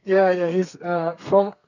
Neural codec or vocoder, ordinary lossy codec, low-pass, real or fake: codec, 32 kHz, 1.9 kbps, SNAC; none; 7.2 kHz; fake